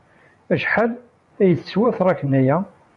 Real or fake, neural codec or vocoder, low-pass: fake; vocoder, 24 kHz, 100 mel bands, Vocos; 10.8 kHz